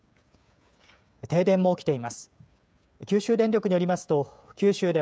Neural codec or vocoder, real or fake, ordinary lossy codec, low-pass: codec, 16 kHz, 16 kbps, FreqCodec, smaller model; fake; none; none